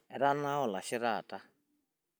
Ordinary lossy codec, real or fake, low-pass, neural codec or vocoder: none; real; none; none